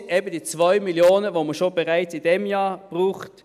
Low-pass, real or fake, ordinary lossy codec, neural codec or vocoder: 14.4 kHz; real; none; none